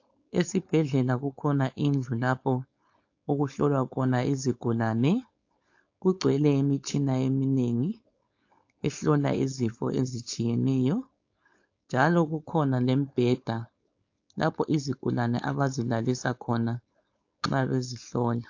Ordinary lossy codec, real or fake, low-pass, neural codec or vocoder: AAC, 48 kbps; fake; 7.2 kHz; codec, 16 kHz, 4.8 kbps, FACodec